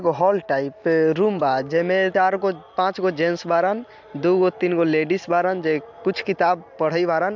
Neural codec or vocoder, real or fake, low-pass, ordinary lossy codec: none; real; 7.2 kHz; MP3, 64 kbps